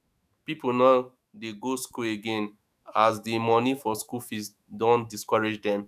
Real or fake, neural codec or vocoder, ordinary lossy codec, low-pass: fake; autoencoder, 48 kHz, 128 numbers a frame, DAC-VAE, trained on Japanese speech; none; 14.4 kHz